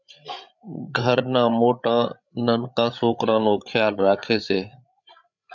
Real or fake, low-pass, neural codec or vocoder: fake; 7.2 kHz; codec, 16 kHz, 8 kbps, FreqCodec, larger model